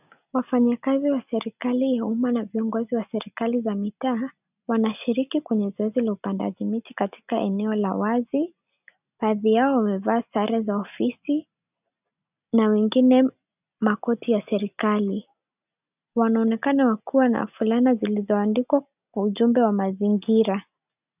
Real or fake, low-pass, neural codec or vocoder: real; 3.6 kHz; none